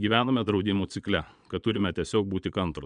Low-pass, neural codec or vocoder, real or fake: 9.9 kHz; vocoder, 22.05 kHz, 80 mel bands, Vocos; fake